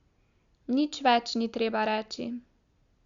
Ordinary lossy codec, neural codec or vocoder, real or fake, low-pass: Opus, 64 kbps; none; real; 7.2 kHz